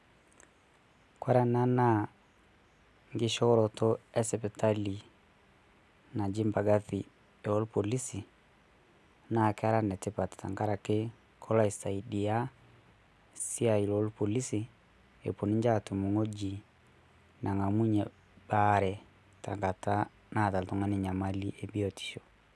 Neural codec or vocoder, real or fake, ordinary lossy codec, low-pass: none; real; none; none